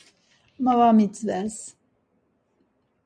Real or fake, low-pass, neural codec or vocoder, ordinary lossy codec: real; 9.9 kHz; none; MP3, 64 kbps